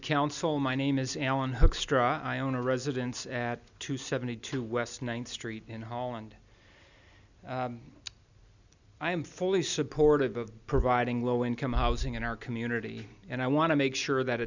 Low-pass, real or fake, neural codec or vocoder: 7.2 kHz; real; none